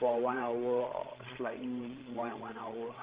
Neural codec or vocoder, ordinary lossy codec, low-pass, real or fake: codec, 16 kHz, 16 kbps, FreqCodec, larger model; Opus, 16 kbps; 3.6 kHz; fake